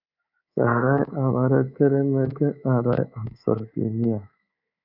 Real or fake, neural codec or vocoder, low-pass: fake; codec, 24 kHz, 3.1 kbps, DualCodec; 5.4 kHz